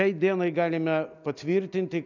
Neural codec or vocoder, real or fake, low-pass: none; real; 7.2 kHz